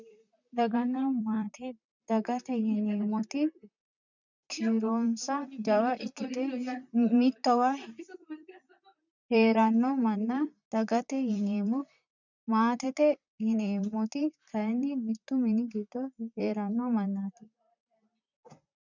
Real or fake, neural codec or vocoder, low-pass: fake; vocoder, 44.1 kHz, 128 mel bands, Pupu-Vocoder; 7.2 kHz